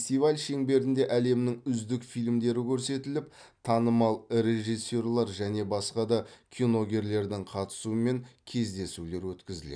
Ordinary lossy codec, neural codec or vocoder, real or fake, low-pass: none; none; real; 9.9 kHz